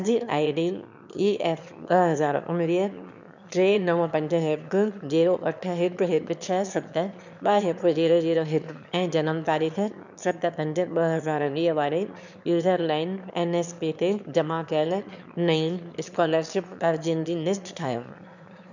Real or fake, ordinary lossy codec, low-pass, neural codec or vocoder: fake; none; 7.2 kHz; autoencoder, 22.05 kHz, a latent of 192 numbers a frame, VITS, trained on one speaker